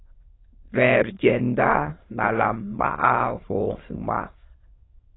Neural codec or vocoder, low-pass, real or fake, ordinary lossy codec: autoencoder, 22.05 kHz, a latent of 192 numbers a frame, VITS, trained on many speakers; 7.2 kHz; fake; AAC, 16 kbps